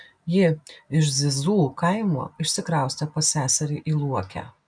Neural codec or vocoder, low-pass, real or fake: none; 9.9 kHz; real